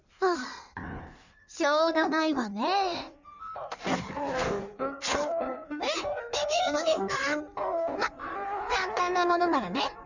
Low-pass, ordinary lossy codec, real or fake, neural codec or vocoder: 7.2 kHz; none; fake; codec, 16 kHz in and 24 kHz out, 1.1 kbps, FireRedTTS-2 codec